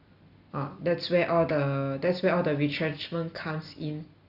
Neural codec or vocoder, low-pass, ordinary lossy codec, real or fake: none; 5.4 kHz; Opus, 64 kbps; real